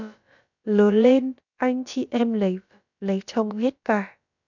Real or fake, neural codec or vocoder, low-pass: fake; codec, 16 kHz, about 1 kbps, DyCAST, with the encoder's durations; 7.2 kHz